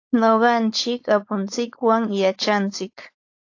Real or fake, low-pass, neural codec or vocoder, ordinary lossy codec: fake; 7.2 kHz; codec, 16 kHz, 4.8 kbps, FACodec; AAC, 48 kbps